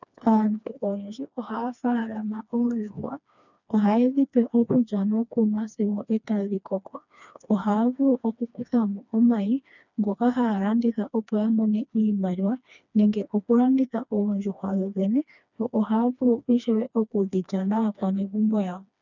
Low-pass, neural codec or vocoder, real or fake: 7.2 kHz; codec, 16 kHz, 2 kbps, FreqCodec, smaller model; fake